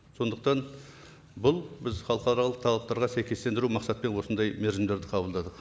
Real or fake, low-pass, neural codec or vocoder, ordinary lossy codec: real; none; none; none